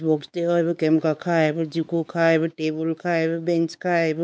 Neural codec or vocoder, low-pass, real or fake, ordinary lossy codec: codec, 16 kHz, 4 kbps, X-Codec, WavLM features, trained on Multilingual LibriSpeech; none; fake; none